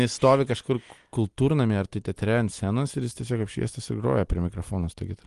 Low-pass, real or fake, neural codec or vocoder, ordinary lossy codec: 10.8 kHz; real; none; Opus, 24 kbps